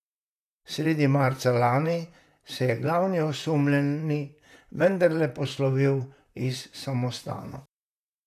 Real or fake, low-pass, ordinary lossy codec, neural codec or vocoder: fake; 14.4 kHz; none; vocoder, 44.1 kHz, 128 mel bands, Pupu-Vocoder